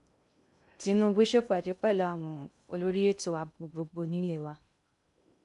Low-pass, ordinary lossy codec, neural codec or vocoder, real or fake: 10.8 kHz; none; codec, 16 kHz in and 24 kHz out, 0.6 kbps, FocalCodec, streaming, 2048 codes; fake